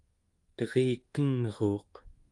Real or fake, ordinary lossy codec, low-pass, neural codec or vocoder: fake; Opus, 24 kbps; 10.8 kHz; codec, 24 kHz, 1.2 kbps, DualCodec